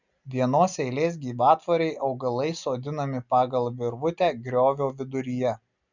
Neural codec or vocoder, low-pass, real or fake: none; 7.2 kHz; real